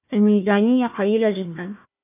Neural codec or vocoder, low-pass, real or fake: codec, 16 kHz, 1 kbps, FunCodec, trained on Chinese and English, 50 frames a second; 3.6 kHz; fake